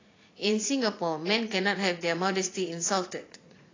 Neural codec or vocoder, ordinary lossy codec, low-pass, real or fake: vocoder, 44.1 kHz, 80 mel bands, Vocos; AAC, 32 kbps; 7.2 kHz; fake